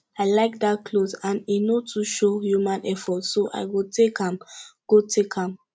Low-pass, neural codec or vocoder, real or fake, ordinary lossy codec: none; none; real; none